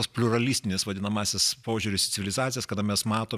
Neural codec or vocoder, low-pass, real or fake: none; 14.4 kHz; real